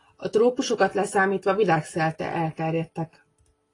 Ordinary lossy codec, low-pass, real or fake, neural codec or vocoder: AAC, 32 kbps; 10.8 kHz; real; none